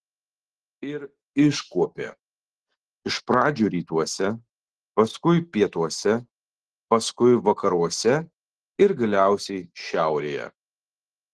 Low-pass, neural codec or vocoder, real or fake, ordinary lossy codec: 10.8 kHz; none; real; Opus, 16 kbps